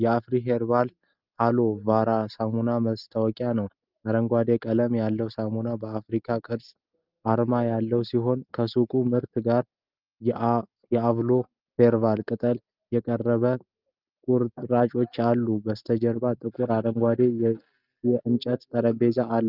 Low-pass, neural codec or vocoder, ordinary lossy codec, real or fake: 5.4 kHz; none; Opus, 24 kbps; real